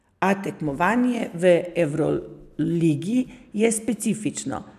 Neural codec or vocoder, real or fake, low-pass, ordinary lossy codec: none; real; 14.4 kHz; none